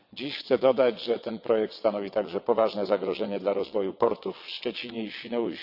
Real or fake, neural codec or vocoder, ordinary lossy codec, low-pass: fake; vocoder, 22.05 kHz, 80 mel bands, WaveNeXt; none; 5.4 kHz